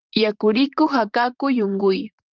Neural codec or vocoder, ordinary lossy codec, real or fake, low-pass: vocoder, 44.1 kHz, 128 mel bands, Pupu-Vocoder; Opus, 32 kbps; fake; 7.2 kHz